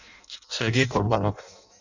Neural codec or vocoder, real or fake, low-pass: codec, 16 kHz in and 24 kHz out, 0.6 kbps, FireRedTTS-2 codec; fake; 7.2 kHz